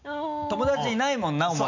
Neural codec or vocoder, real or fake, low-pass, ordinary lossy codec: none; real; 7.2 kHz; none